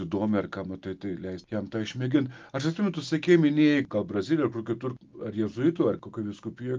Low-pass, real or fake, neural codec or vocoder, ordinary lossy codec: 7.2 kHz; real; none; Opus, 32 kbps